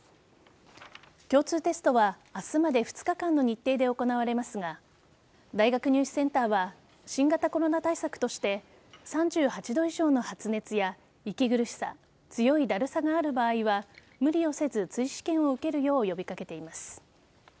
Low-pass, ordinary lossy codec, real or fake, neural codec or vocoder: none; none; real; none